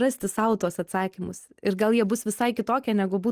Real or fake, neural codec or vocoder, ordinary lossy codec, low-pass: real; none; Opus, 24 kbps; 14.4 kHz